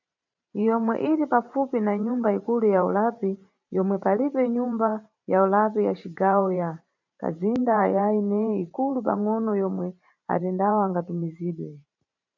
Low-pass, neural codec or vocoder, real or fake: 7.2 kHz; vocoder, 44.1 kHz, 128 mel bands every 512 samples, BigVGAN v2; fake